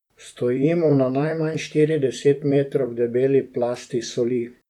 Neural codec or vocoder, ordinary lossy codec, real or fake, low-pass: vocoder, 44.1 kHz, 128 mel bands, Pupu-Vocoder; none; fake; 19.8 kHz